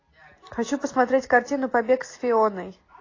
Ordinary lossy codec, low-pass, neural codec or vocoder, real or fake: AAC, 32 kbps; 7.2 kHz; none; real